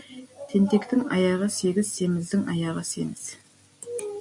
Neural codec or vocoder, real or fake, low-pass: none; real; 10.8 kHz